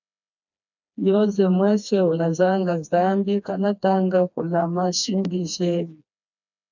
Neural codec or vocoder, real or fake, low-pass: codec, 16 kHz, 2 kbps, FreqCodec, smaller model; fake; 7.2 kHz